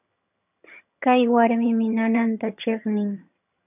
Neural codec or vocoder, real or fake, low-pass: vocoder, 22.05 kHz, 80 mel bands, HiFi-GAN; fake; 3.6 kHz